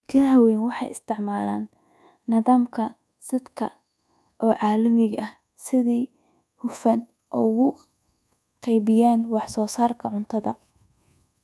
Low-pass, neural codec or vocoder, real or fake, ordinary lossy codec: none; codec, 24 kHz, 1.2 kbps, DualCodec; fake; none